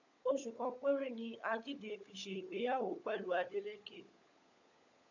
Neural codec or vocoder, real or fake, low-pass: codec, 16 kHz, 16 kbps, FunCodec, trained on LibriTTS, 50 frames a second; fake; 7.2 kHz